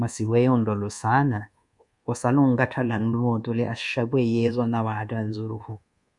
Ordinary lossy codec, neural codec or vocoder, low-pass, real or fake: Opus, 64 kbps; codec, 24 kHz, 1.2 kbps, DualCodec; 10.8 kHz; fake